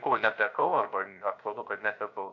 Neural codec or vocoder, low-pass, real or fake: codec, 16 kHz, 0.7 kbps, FocalCodec; 7.2 kHz; fake